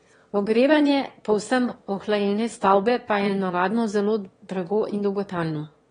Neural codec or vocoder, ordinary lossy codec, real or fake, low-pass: autoencoder, 22.05 kHz, a latent of 192 numbers a frame, VITS, trained on one speaker; AAC, 32 kbps; fake; 9.9 kHz